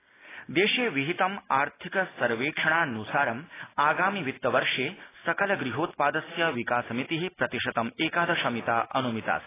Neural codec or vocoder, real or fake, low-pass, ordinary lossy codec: none; real; 3.6 kHz; AAC, 16 kbps